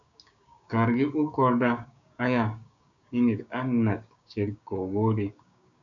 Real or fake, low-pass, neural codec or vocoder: fake; 7.2 kHz; codec, 16 kHz, 6 kbps, DAC